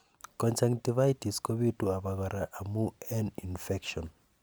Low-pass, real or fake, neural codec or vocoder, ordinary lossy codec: none; real; none; none